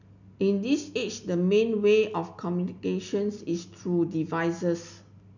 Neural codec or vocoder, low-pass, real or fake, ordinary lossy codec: none; 7.2 kHz; real; none